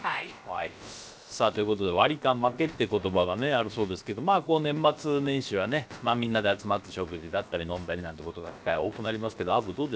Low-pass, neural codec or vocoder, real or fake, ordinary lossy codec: none; codec, 16 kHz, about 1 kbps, DyCAST, with the encoder's durations; fake; none